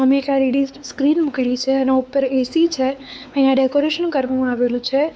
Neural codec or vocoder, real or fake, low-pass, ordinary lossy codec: codec, 16 kHz, 4 kbps, X-Codec, WavLM features, trained on Multilingual LibriSpeech; fake; none; none